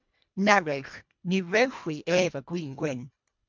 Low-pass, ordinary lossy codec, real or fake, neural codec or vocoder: 7.2 kHz; MP3, 64 kbps; fake; codec, 24 kHz, 1.5 kbps, HILCodec